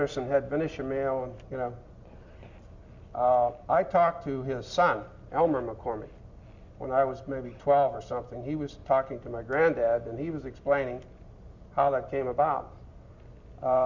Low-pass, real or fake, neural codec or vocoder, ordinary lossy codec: 7.2 kHz; real; none; Opus, 64 kbps